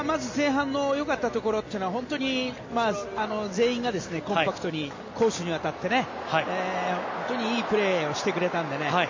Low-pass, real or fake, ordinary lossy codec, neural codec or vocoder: 7.2 kHz; real; MP3, 32 kbps; none